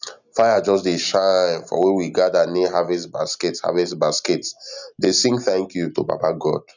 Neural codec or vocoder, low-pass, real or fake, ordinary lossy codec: none; 7.2 kHz; real; none